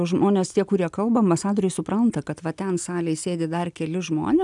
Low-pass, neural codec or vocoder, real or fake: 10.8 kHz; none; real